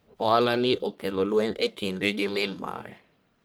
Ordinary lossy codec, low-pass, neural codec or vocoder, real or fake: none; none; codec, 44.1 kHz, 1.7 kbps, Pupu-Codec; fake